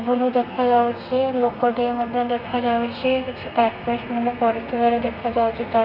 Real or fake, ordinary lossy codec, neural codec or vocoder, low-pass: fake; none; codec, 32 kHz, 1.9 kbps, SNAC; 5.4 kHz